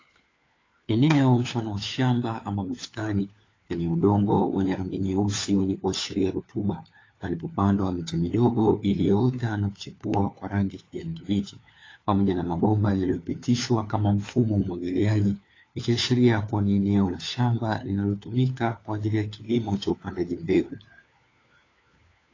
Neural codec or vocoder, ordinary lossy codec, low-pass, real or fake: codec, 16 kHz, 4 kbps, FunCodec, trained on LibriTTS, 50 frames a second; AAC, 32 kbps; 7.2 kHz; fake